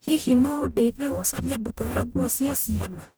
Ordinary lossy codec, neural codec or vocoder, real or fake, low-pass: none; codec, 44.1 kHz, 0.9 kbps, DAC; fake; none